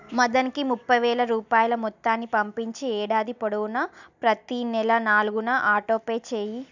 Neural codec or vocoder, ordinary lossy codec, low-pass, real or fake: none; none; 7.2 kHz; real